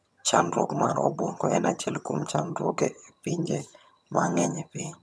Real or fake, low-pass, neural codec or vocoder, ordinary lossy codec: fake; none; vocoder, 22.05 kHz, 80 mel bands, HiFi-GAN; none